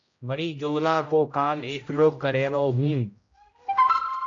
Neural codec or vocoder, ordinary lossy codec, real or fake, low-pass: codec, 16 kHz, 0.5 kbps, X-Codec, HuBERT features, trained on general audio; AAC, 48 kbps; fake; 7.2 kHz